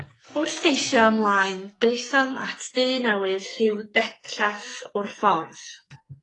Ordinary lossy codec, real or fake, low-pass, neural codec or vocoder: AAC, 32 kbps; fake; 10.8 kHz; codec, 44.1 kHz, 2.6 kbps, SNAC